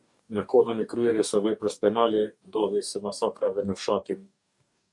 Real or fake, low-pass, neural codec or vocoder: fake; 10.8 kHz; codec, 44.1 kHz, 2.6 kbps, DAC